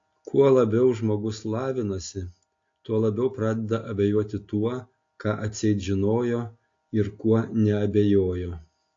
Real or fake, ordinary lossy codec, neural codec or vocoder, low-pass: real; AAC, 48 kbps; none; 7.2 kHz